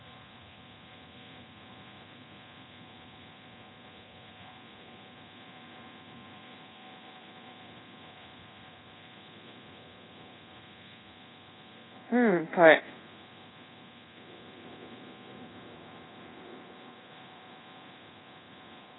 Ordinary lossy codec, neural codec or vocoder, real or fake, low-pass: AAC, 16 kbps; codec, 24 kHz, 0.9 kbps, DualCodec; fake; 7.2 kHz